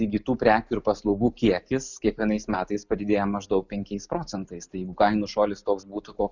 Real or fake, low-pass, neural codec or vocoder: real; 7.2 kHz; none